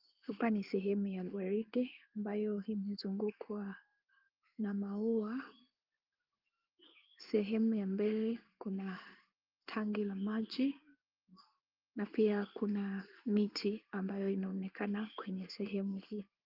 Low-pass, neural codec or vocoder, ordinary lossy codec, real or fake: 5.4 kHz; codec, 16 kHz in and 24 kHz out, 1 kbps, XY-Tokenizer; Opus, 24 kbps; fake